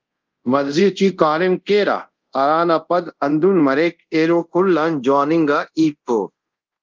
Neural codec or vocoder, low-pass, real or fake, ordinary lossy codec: codec, 24 kHz, 0.5 kbps, DualCodec; 7.2 kHz; fake; Opus, 32 kbps